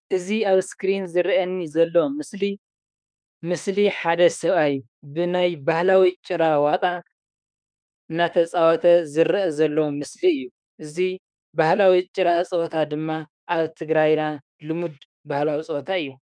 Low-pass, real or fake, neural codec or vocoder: 9.9 kHz; fake; autoencoder, 48 kHz, 32 numbers a frame, DAC-VAE, trained on Japanese speech